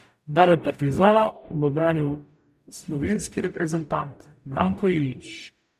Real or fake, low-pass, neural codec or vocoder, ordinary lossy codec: fake; 14.4 kHz; codec, 44.1 kHz, 0.9 kbps, DAC; none